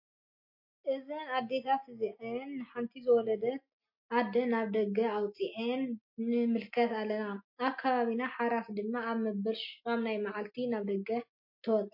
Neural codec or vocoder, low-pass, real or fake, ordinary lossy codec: none; 5.4 kHz; real; MP3, 32 kbps